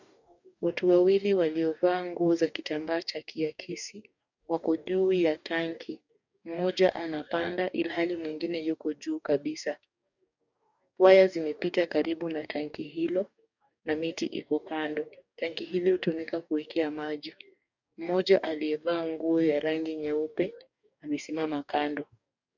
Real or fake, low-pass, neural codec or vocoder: fake; 7.2 kHz; codec, 44.1 kHz, 2.6 kbps, DAC